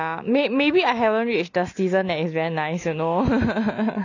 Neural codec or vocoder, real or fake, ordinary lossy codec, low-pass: none; real; none; 7.2 kHz